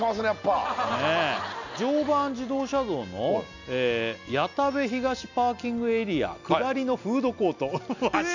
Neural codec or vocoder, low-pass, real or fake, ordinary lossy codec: none; 7.2 kHz; real; none